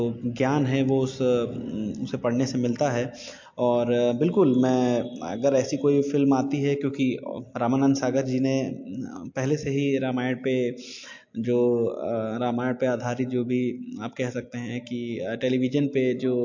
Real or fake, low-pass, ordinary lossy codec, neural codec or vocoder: real; 7.2 kHz; MP3, 48 kbps; none